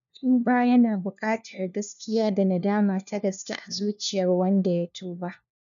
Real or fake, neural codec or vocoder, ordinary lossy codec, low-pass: fake; codec, 16 kHz, 1 kbps, FunCodec, trained on LibriTTS, 50 frames a second; none; 7.2 kHz